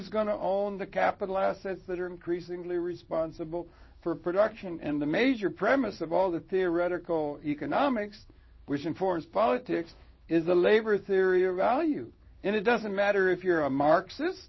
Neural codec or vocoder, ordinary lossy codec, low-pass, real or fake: codec, 16 kHz in and 24 kHz out, 1 kbps, XY-Tokenizer; MP3, 24 kbps; 7.2 kHz; fake